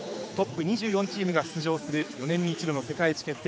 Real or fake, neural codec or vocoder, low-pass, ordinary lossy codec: fake; codec, 16 kHz, 4 kbps, X-Codec, HuBERT features, trained on general audio; none; none